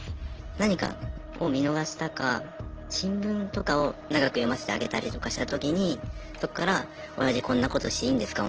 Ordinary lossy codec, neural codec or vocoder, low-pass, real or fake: Opus, 16 kbps; none; 7.2 kHz; real